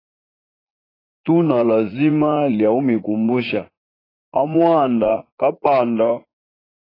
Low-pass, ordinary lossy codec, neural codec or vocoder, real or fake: 5.4 kHz; AAC, 24 kbps; vocoder, 44.1 kHz, 80 mel bands, Vocos; fake